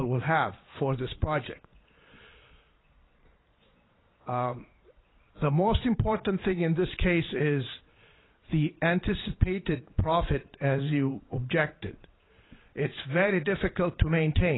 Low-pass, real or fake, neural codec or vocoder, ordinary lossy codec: 7.2 kHz; fake; vocoder, 22.05 kHz, 80 mel bands, Vocos; AAC, 16 kbps